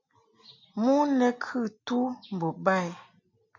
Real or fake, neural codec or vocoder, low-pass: real; none; 7.2 kHz